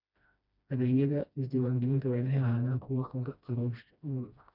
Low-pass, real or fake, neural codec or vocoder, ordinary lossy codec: 5.4 kHz; fake; codec, 16 kHz, 1 kbps, FreqCodec, smaller model; none